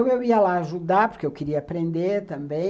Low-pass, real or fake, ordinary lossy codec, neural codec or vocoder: none; real; none; none